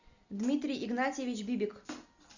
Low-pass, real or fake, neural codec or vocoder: 7.2 kHz; real; none